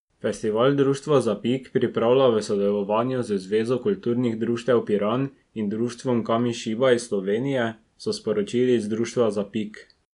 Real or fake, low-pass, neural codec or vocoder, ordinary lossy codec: real; 10.8 kHz; none; none